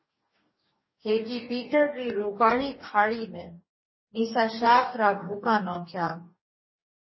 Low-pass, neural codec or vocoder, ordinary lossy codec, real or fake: 7.2 kHz; codec, 44.1 kHz, 2.6 kbps, DAC; MP3, 24 kbps; fake